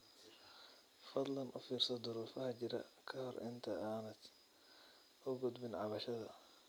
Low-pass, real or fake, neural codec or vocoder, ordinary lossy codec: none; real; none; none